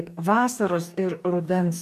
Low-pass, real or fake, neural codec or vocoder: 14.4 kHz; fake; codec, 44.1 kHz, 2.6 kbps, DAC